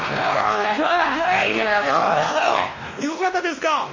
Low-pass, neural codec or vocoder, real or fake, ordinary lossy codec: 7.2 kHz; codec, 16 kHz, 1 kbps, X-Codec, WavLM features, trained on Multilingual LibriSpeech; fake; MP3, 32 kbps